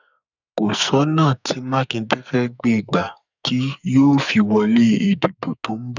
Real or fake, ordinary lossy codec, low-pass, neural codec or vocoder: fake; none; 7.2 kHz; codec, 44.1 kHz, 2.6 kbps, SNAC